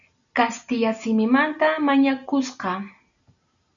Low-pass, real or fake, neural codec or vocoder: 7.2 kHz; real; none